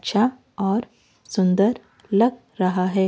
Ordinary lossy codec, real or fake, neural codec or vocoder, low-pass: none; real; none; none